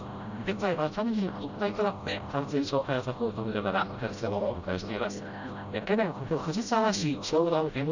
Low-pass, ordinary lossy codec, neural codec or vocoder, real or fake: 7.2 kHz; Opus, 64 kbps; codec, 16 kHz, 0.5 kbps, FreqCodec, smaller model; fake